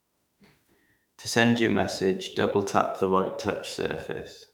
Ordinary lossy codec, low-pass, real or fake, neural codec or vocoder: none; 19.8 kHz; fake; autoencoder, 48 kHz, 32 numbers a frame, DAC-VAE, trained on Japanese speech